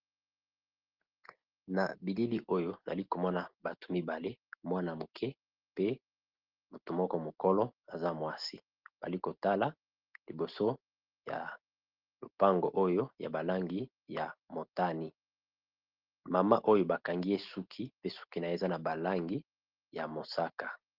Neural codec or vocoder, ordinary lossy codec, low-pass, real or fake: none; Opus, 16 kbps; 5.4 kHz; real